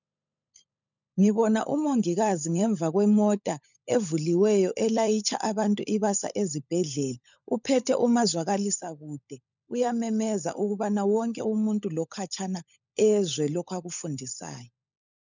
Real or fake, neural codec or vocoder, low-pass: fake; codec, 16 kHz, 16 kbps, FunCodec, trained on LibriTTS, 50 frames a second; 7.2 kHz